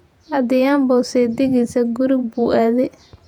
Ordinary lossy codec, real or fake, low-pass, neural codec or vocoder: none; fake; 19.8 kHz; vocoder, 48 kHz, 128 mel bands, Vocos